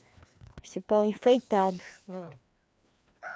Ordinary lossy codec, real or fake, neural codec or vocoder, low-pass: none; fake; codec, 16 kHz, 2 kbps, FreqCodec, larger model; none